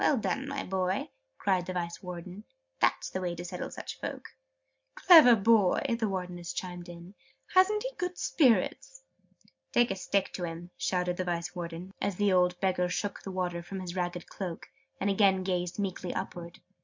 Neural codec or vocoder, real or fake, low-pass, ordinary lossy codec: none; real; 7.2 kHz; MP3, 64 kbps